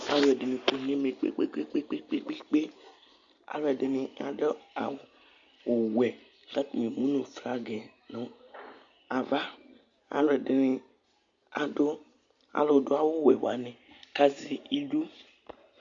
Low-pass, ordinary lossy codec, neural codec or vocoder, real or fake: 7.2 kHz; Opus, 64 kbps; none; real